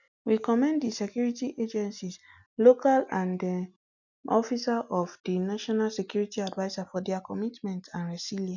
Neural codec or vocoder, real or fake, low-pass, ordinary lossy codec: none; real; 7.2 kHz; none